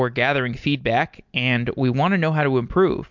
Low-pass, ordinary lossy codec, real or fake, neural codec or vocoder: 7.2 kHz; MP3, 64 kbps; real; none